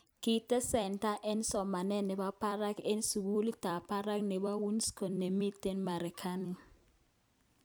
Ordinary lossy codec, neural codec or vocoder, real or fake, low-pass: none; vocoder, 44.1 kHz, 128 mel bands every 256 samples, BigVGAN v2; fake; none